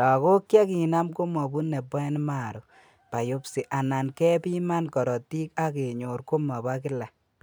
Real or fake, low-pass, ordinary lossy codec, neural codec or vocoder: real; none; none; none